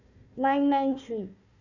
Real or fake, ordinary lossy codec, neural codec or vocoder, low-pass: fake; none; codec, 16 kHz, 1 kbps, FunCodec, trained on Chinese and English, 50 frames a second; 7.2 kHz